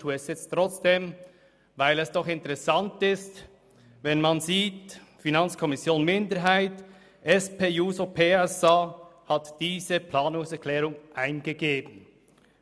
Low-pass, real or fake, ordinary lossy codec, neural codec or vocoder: none; real; none; none